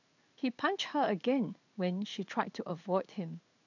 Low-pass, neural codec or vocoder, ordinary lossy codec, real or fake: 7.2 kHz; codec, 16 kHz in and 24 kHz out, 1 kbps, XY-Tokenizer; none; fake